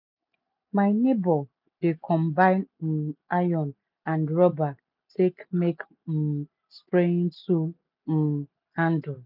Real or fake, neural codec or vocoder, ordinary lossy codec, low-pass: real; none; none; 5.4 kHz